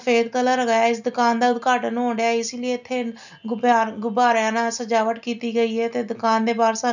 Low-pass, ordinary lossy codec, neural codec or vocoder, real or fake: 7.2 kHz; none; none; real